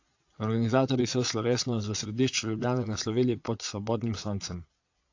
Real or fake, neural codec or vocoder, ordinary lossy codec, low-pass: fake; vocoder, 22.05 kHz, 80 mel bands, Vocos; AAC, 48 kbps; 7.2 kHz